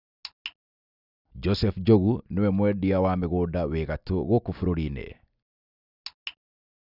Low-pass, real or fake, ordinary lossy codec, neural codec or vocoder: 5.4 kHz; real; none; none